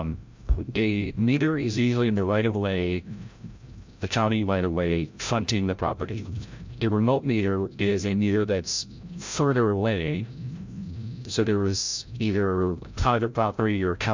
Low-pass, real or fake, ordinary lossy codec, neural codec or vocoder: 7.2 kHz; fake; MP3, 64 kbps; codec, 16 kHz, 0.5 kbps, FreqCodec, larger model